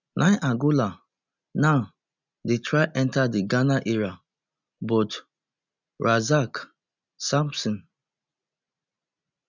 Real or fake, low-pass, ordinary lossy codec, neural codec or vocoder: real; 7.2 kHz; none; none